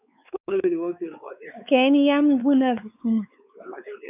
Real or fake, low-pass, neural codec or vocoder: fake; 3.6 kHz; codec, 16 kHz, 4 kbps, X-Codec, WavLM features, trained on Multilingual LibriSpeech